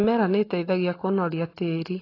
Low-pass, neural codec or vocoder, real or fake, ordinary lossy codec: 5.4 kHz; none; real; AAC, 24 kbps